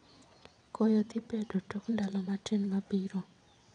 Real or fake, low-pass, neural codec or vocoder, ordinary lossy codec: fake; 9.9 kHz; vocoder, 22.05 kHz, 80 mel bands, WaveNeXt; none